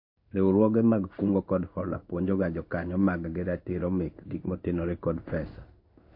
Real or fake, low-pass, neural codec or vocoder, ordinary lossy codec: fake; 5.4 kHz; codec, 16 kHz in and 24 kHz out, 1 kbps, XY-Tokenizer; MP3, 32 kbps